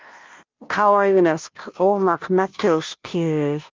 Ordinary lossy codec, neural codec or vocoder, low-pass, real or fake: Opus, 32 kbps; codec, 16 kHz, 0.5 kbps, FunCodec, trained on Chinese and English, 25 frames a second; 7.2 kHz; fake